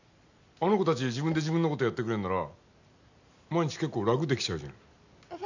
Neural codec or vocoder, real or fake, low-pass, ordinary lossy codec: none; real; 7.2 kHz; none